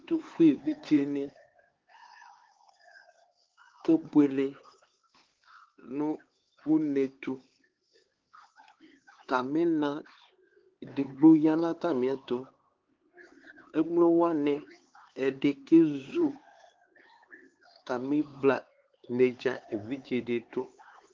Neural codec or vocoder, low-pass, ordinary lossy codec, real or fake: codec, 16 kHz, 4 kbps, X-Codec, HuBERT features, trained on LibriSpeech; 7.2 kHz; Opus, 16 kbps; fake